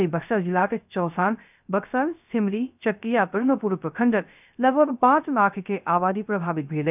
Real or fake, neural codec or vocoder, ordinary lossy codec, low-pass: fake; codec, 16 kHz, 0.3 kbps, FocalCodec; none; 3.6 kHz